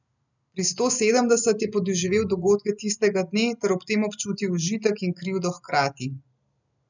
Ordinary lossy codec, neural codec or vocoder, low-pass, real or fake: none; none; 7.2 kHz; real